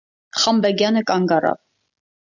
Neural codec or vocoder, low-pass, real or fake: none; 7.2 kHz; real